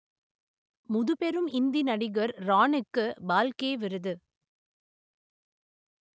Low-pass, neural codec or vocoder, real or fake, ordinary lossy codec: none; none; real; none